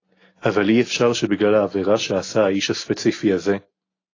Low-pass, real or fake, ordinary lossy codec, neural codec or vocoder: 7.2 kHz; real; AAC, 32 kbps; none